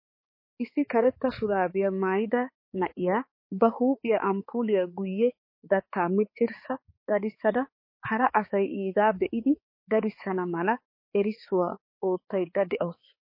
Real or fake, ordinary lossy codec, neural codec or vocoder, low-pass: fake; MP3, 24 kbps; codec, 16 kHz, 4 kbps, X-Codec, HuBERT features, trained on balanced general audio; 5.4 kHz